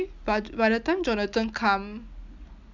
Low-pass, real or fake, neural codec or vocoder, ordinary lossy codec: 7.2 kHz; real; none; none